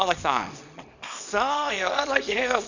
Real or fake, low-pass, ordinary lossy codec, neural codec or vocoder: fake; 7.2 kHz; none; codec, 24 kHz, 0.9 kbps, WavTokenizer, small release